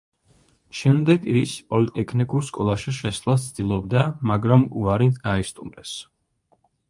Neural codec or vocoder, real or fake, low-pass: codec, 24 kHz, 0.9 kbps, WavTokenizer, medium speech release version 2; fake; 10.8 kHz